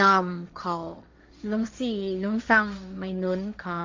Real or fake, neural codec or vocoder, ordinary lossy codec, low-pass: fake; codec, 16 kHz, 1.1 kbps, Voila-Tokenizer; MP3, 64 kbps; 7.2 kHz